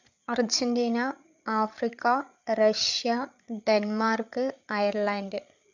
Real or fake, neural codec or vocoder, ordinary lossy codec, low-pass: fake; codec, 16 kHz, 8 kbps, FreqCodec, larger model; none; 7.2 kHz